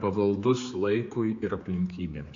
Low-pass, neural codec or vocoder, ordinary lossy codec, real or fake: 7.2 kHz; codec, 16 kHz, 4 kbps, X-Codec, HuBERT features, trained on general audio; AAC, 48 kbps; fake